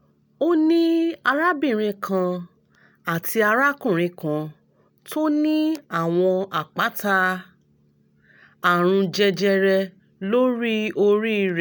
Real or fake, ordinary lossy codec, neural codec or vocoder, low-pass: real; none; none; none